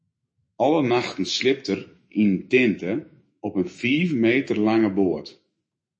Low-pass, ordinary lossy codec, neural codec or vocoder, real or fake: 9.9 kHz; MP3, 32 kbps; autoencoder, 48 kHz, 128 numbers a frame, DAC-VAE, trained on Japanese speech; fake